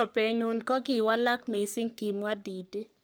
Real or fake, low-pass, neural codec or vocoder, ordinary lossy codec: fake; none; codec, 44.1 kHz, 3.4 kbps, Pupu-Codec; none